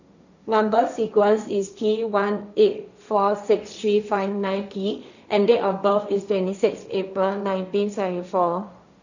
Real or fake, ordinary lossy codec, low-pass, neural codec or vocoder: fake; none; 7.2 kHz; codec, 16 kHz, 1.1 kbps, Voila-Tokenizer